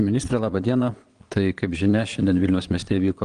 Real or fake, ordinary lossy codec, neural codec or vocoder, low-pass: fake; Opus, 24 kbps; vocoder, 22.05 kHz, 80 mel bands, Vocos; 9.9 kHz